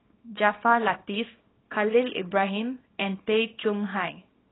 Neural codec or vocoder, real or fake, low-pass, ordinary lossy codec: codec, 24 kHz, 0.9 kbps, WavTokenizer, small release; fake; 7.2 kHz; AAC, 16 kbps